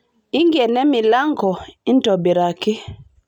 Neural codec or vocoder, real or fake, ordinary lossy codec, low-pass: none; real; none; 19.8 kHz